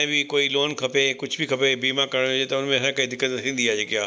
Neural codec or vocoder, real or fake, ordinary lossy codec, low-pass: none; real; none; none